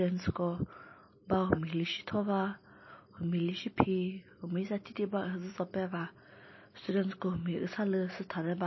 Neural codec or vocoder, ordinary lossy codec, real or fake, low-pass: none; MP3, 24 kbps; real; 7.2 kHz